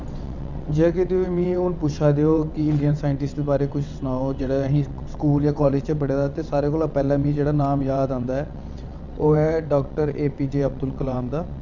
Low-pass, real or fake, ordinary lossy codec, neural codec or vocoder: 7.2 kHz; fake; none; vocoder, 22.05 kHz, 80 mel bands, WaveNeXt